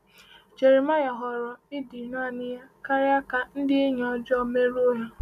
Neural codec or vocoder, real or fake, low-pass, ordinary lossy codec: none; real; 14.4 kHz; none